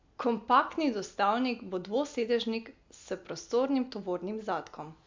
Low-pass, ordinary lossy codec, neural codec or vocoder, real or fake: 7.2 kHz; MP3, 48 kbps; none; real